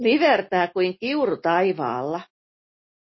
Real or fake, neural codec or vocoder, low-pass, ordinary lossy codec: real; none; 7.2 kHz; MP3, 24 kbps